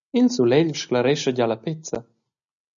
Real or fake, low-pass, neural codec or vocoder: real; 7.2 kHz; none